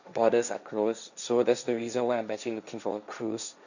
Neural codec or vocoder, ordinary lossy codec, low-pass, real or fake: codec, 16 kHz, 1.1 kbps, Voila-Tokenizer; none; 7.2 kHz; fake